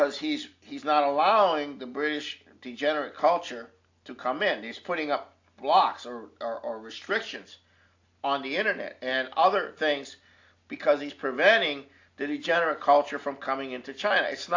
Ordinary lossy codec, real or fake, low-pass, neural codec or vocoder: AAC, 48 kbps; real; 7.2 kHz; none